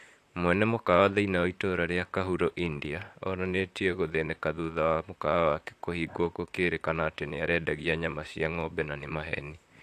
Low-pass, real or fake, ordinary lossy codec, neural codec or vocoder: 14.4 kHz; fake; AAC, 64 kbps; vocoder, 44.1 kHz, 128 mel bands, Pupu-Vocoder